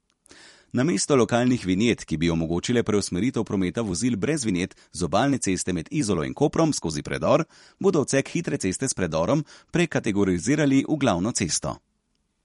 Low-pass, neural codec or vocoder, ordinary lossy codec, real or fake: 19.8 kHz; vocoder, 44.1 kHz, 128 mel bands every 256 samples, BigVGAN v2; MP3, 48 kbps; fake